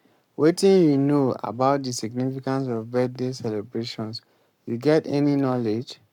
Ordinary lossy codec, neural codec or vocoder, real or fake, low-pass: none; codec, 44.1 kHz, 7.8 kbps, Pupu-Codec; fake; 19.8 kHz